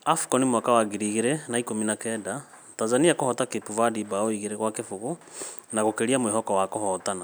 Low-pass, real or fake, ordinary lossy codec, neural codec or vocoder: none; real; none; none